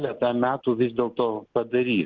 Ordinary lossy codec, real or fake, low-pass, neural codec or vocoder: Opus, 16 kbps; real; 7.2 kHz; none